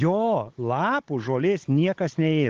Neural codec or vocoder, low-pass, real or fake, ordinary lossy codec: codec, 16 kHz, 16 kbps, FunCodec, trained on Chinese and English, 50 frames a second; 7.2 kHz; fake; Opus, 16 kbps